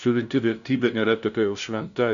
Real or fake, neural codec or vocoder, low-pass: fake; codec, 16 kHz, 0.5 kbps, FunCodec, trained on LibriTTS, 25 frames a second; 7.2 kHz